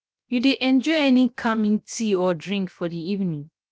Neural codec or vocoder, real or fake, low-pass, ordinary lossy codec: codec, 16 kHz, 0.7 kbps, FocalCodec; fake; none; none